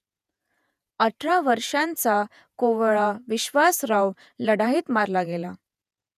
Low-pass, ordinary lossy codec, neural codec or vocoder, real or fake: 14.4 kHz; none; vocoder, 48 kHz, 128 mel bands, Vocos; fake